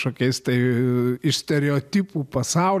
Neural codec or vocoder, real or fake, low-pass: none; real; 14.4 kHz